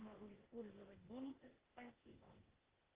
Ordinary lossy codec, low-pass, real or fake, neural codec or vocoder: Opus, 16 kbps; 3.6 kHz; fake; codec, 16 kHz, 0.8 kbps, ZipCodec